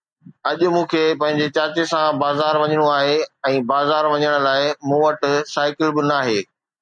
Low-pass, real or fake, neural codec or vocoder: 9.9 kHz; real; none